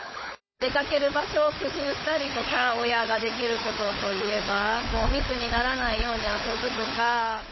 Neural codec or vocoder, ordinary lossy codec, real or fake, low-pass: codec, 16 kHz, 16 kbps, FunCodec, trained on LibriTTS, 50 frames a second; MP3, 24 kbps; fake; 7.2 kHz